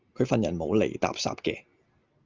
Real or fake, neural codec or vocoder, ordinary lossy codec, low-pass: real; none; Opus, 32 kbps; 7.2 kHz